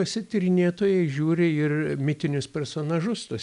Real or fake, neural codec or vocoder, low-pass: real; none; 10.8 kHz